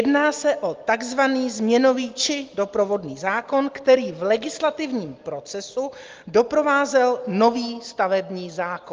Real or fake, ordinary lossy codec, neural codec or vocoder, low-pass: real; Opus, 24 kbps; none; 7.2 kHz